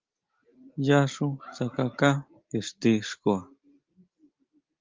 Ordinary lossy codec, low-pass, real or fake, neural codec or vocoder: Opus, 32 kbps; 7.2 kHz; real; none